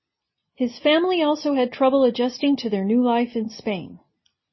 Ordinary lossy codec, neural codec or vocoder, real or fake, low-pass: MP3, 24 kbps; none; real; 7.2 kHz